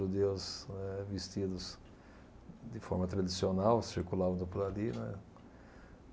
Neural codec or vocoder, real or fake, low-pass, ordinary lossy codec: none; real; none; none